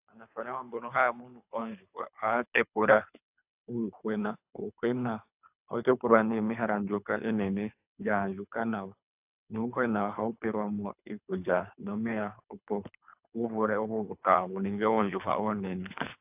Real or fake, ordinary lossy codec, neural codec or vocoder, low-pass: fake; AAC, 32 kbps; codec, 24 kHz, 3 kbps, HILCodec; 3.6 kHz